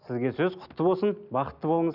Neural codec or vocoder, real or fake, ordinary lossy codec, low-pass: none; real; none; 5.4 kHz